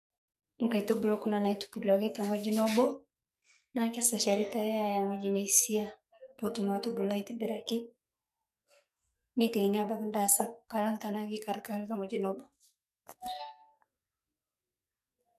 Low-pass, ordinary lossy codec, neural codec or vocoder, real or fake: 14.4 kHz; none; codec, 44.1 kHz, 2.6 kbps, SNAC; fake